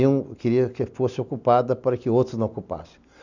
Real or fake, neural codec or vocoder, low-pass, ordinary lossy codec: real; none; 7.2 kHz; none